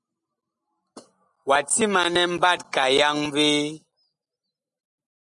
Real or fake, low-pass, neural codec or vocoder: real; 10.8 kHz; none